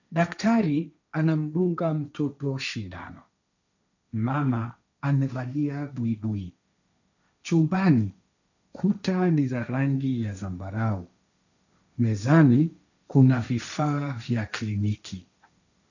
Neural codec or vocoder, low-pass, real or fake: codec, 16 kHz, 1.1 kbps, Voila-Tokenizer; 7.2 kHz; fake